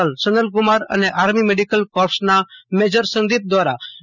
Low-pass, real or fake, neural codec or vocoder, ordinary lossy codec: 7.2 kHz; real; none; none